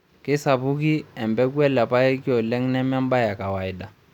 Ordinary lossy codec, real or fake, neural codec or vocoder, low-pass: none; real; none; 19.8 kHz